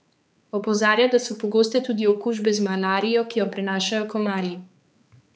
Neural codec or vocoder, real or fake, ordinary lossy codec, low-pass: codec, 16 kHz, 4 kbps, X-Codec, HuBERT features, trained on balanced general audio; fake; none; none